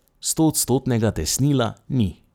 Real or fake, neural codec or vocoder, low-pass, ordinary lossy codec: fake; vocoder, 44.1 kHz, 128 mel bands every 256 samples, BigVGAN v2; none; none